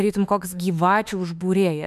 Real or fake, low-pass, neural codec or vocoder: fake; 14.4 kHz; autoencoder, 48 kHz, 32 numbers a frame, DAC-VAE, trained on Japanese speech